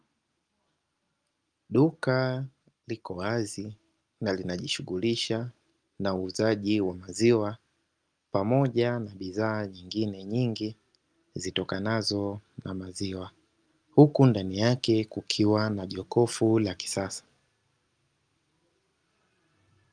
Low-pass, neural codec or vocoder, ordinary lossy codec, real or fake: 9.9 kHz; none; Opus, 32 kbps; real